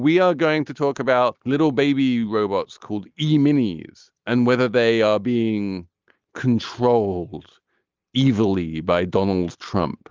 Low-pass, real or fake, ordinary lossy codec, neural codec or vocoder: 7.2 kHz; real; Opus, 32 kbps; none